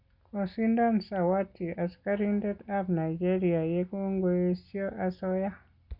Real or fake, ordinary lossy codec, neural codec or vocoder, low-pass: real; Opus, 64 kbps; none; 5.4 kHz